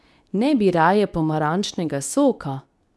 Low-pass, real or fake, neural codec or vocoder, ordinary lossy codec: none; fake; codec, 24 kHz, 0.9 kbps, WavTokenizer, medium speech release version 2; none